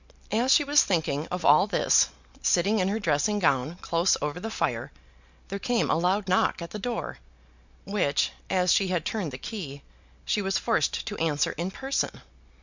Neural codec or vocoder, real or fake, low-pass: none; real; 7.2 kHz